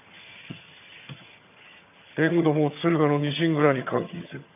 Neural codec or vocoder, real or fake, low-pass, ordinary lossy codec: vocoder, 22.05 kHz, 80 mel bands, HiFi-GAN; fake; 3.6 kHz; none